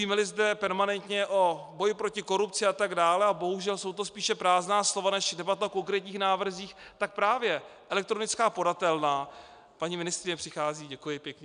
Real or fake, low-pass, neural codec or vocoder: real; 9.9 kHz; none